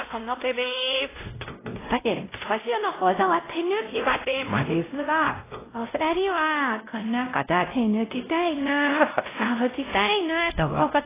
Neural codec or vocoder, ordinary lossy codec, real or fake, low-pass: codec, 16 kHz, 0.5 kbps, X-Codec, WavLM features, trained on Multilingual LibriSpeech; AAC, 16 kbps; fake; 3.6 kHz